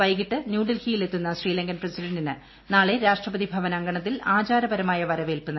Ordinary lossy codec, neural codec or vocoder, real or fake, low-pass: MP3, 24 kbps; none; real; 7.2 kHz